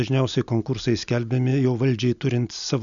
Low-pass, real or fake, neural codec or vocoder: 7.2 kHz; real; none